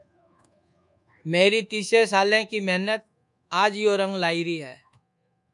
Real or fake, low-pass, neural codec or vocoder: fake; 10.8 kHz; codec, 24 kHz, 1.2 kbps, DualCodec